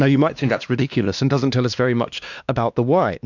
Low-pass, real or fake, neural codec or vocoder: 7.2 kHz; fake; codec, 16 kHz, 2 kbps, X-Codec, WavLM features, trained on Multilingual LibriSpeech